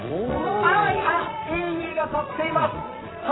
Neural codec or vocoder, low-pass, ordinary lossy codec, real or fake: vocoder, 22.05 kHz, 80 mel bands, Vocos; 7.2 kHz; AAC, 16 kbps; fake